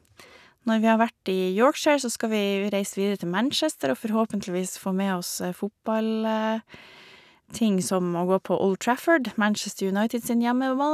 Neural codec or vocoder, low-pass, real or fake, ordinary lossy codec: none; 14.4 kHz; real; none